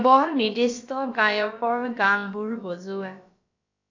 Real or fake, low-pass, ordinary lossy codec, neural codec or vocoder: fake; 7.2 kHz; AAC, 48 kbps; codec, 16 kHz, about 1 kbps, DyCAST, with the encoder's durations